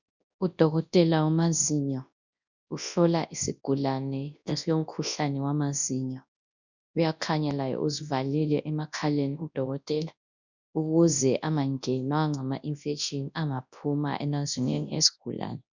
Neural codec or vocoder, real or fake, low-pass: codec, 24 kHz, 0.9 kbps, WavTokenizer, large speech release; fake; 7.2 kHz